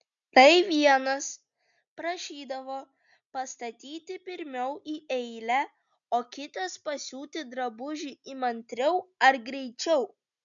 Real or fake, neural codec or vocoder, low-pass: real; none; 7.2 kHz